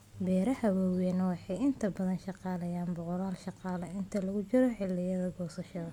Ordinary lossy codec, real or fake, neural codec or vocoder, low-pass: MP3, 96 kbps; real; none; 19.8 kHz